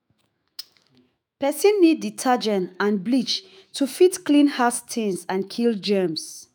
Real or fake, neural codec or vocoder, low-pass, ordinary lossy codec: fake; autoencoder, 48 kHz, 128 numbers a frame, DAC-VAE, trained on Japanese speech; none; none